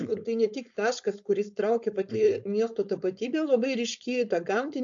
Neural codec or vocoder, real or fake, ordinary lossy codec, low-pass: codec, 16 kHz, 4.8 kbps, FACodec; fake; AAC, 64 kbps; 7.2 kHz